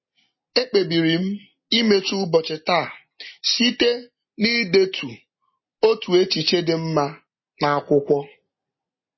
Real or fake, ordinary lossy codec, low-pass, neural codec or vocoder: real; MP3, 24 kbps; 7.2 kHz; none